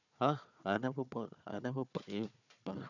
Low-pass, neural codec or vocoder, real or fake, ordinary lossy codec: 7.2 kHz; codec, 16 kHz, 4 kbps, FunCodec, trained on Chinese and English, 50 frames a second; fake; none